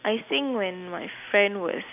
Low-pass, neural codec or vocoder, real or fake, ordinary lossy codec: 3.6 kHz; none; real; none